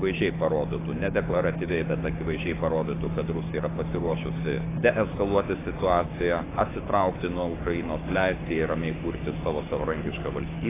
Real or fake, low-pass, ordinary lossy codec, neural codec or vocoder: fake; 3.6 kHz; AAC, 16 kbps; codec, 16 kHz, 6 kbps, DAC